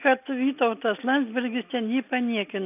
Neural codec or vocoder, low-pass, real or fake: none; 3.6 kHz; real